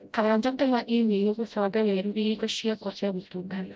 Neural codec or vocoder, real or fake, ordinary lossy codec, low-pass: codec, 16 kHz, 0.5 kbps, FreqCodec, smaller model; fake; none; none